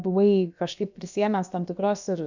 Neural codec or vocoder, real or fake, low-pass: codec, 16 kHz, about 1 kbps, DyCAST, with the encoder's durations; fake; 7.2 kHz